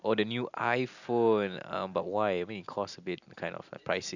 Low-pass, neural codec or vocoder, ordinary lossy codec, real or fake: 7.2 kHz; none; none; real